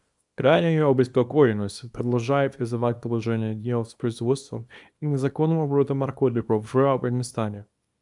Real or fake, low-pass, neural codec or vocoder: fake; 10.8 kHz; codec, 24 kHz, 0.9 kbps, WavTokenizer, small release